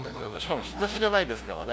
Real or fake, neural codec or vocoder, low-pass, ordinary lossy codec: fake; codec, 16 kHz, 0.5 kbps, FunCodec, trained on LibriTTS, 25 frames a second; none; none